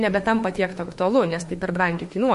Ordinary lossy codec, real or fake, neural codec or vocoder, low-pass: MP3, 48 kbps; fake; autoencoder, 48 kHz, 32 numbers a frame, DAC-VAE, trained on Japanese speech; 14.4 kHz